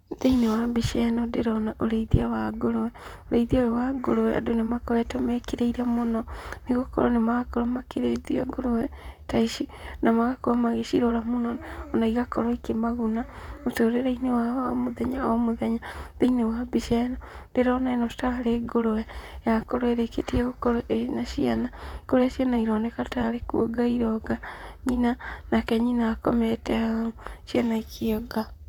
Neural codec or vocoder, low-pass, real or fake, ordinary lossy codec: vocoder, 44.1 kHz, 128 mel bands, Pupu-Vocoder; 19.8 kHz; fake; none